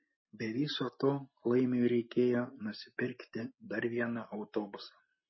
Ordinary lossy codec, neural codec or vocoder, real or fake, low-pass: MP3, 24 kbps; none; real; 7.2 kHz